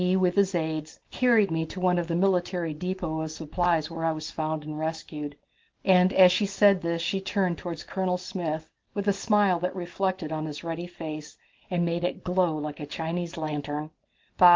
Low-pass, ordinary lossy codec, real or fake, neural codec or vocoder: 7.2 kHz; Opus, 16 kbps; real; none